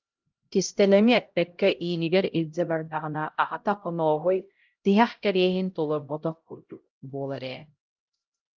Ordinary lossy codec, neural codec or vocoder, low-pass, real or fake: Opus, 32 kbps; codec, 16 kHz, 0.5 kbps, X-Codec, HuBERT features, trained on LibriSpeech; 7.2 kHz; fake